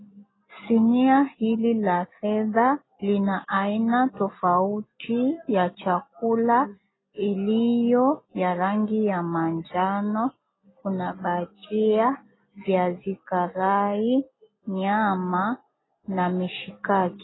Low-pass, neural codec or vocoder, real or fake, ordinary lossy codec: 7.2 kHz; none; real; AAC, 16 kbps